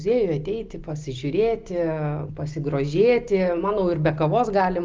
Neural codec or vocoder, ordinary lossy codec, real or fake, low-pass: none; Opus, 24 kbps; real; 7.2 kHz